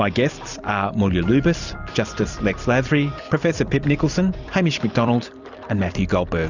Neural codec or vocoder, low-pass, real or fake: vocoder, 44.1 kHz, 128 mel bands every 256 samples, BigVGAN v2; 7.2 kHz; fake